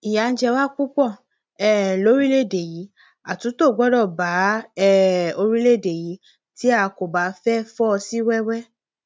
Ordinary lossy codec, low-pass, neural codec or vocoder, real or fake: none; none; none; real